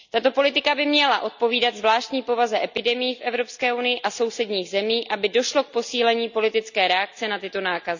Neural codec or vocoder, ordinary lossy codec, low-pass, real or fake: none; none; 7.2 kHz; real